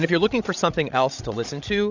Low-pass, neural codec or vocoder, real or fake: 7.2 kHz; codec, 16 kHz, 16 kbps, FreqCodec, larger model; fake